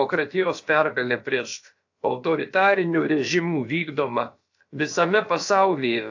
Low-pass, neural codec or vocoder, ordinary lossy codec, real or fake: 7.2 kHz; codec, 16 kHz, about 1 kbps, DyCAST, with the encoder's durations; AAC, 48 kbps; fake